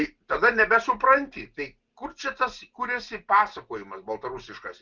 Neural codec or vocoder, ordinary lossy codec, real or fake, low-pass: none; Opus, 32 kbps; real; 7.2 kHz